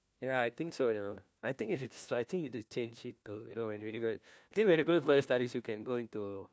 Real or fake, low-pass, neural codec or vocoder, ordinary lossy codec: fake; none; codec, 16 kHz, 1 kbps, FunCodec, trained on LibriTTS, 50 frames a second; none